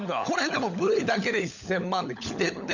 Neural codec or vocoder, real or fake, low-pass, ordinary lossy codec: codec, 16 kHz, 16 kbps, FunCodec, trained on LibriTTS, 50 frames a second; fake; 7.2 kHz; none